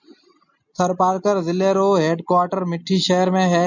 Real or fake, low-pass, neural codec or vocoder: real; 7.2 kHz; none